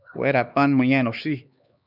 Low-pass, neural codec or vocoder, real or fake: 5.4 kHz; codec, 16 kHz, 2 kbps, X-Codec, HuBERT features, trained on LibriSpeech; fake